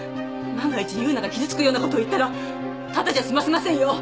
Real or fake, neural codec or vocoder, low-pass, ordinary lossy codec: real; none; none; none